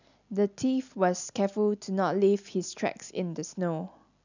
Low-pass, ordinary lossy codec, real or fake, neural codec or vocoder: 7.2 kHz; none; real; none